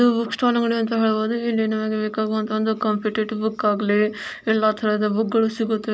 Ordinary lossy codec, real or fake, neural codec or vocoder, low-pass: none; real; none; none